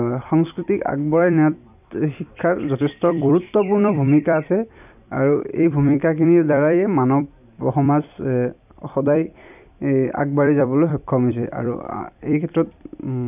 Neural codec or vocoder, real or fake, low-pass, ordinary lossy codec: vocoder, 44.1 kHz, 128 mel bands every 256 samples, BigVGAN v2; fake; 3.6 kHz; none